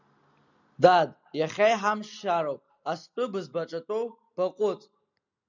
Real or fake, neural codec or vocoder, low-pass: real; none; 7.2 kHz